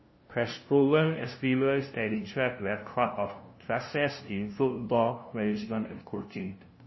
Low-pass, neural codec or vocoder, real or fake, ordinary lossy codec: 7.2 kHz; codec, 16 kHz, 0.5 kbps, FunCodec, trained on LibriTTS, 25 frames a second; fake; MP3, 24 kbps